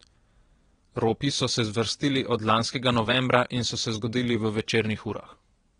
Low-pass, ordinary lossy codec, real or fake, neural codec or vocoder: 9.9 kHz; AAC, 32 kbps; fake; vocoder, 22.05 kHz, 80 mel bands, WaveNeXt